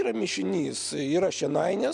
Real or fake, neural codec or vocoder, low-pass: real; none; 10.8 kHz